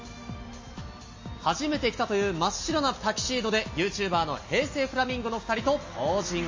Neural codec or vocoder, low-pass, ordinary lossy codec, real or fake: none; 7.2 kHz; MP3, 32 kbps; real